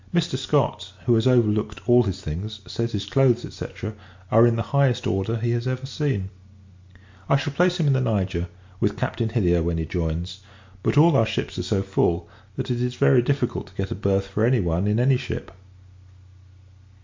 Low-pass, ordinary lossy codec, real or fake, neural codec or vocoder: 7.2 kHz; MP3, 48 kbps; real; none